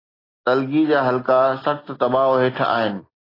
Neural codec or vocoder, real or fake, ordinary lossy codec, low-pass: none; real; AAC, 24 kbps; 5.4 kHz